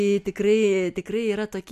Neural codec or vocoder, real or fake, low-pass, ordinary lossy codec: none; real; 14.4 kHz; MP3, 96 kbps